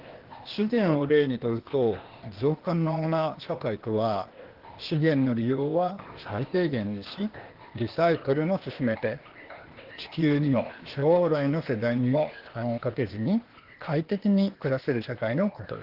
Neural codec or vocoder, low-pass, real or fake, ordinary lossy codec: codec, 16 kHz, 0.8 kbps, ZipCodec; 5.4 kHz; fake; Opus, 16 kbps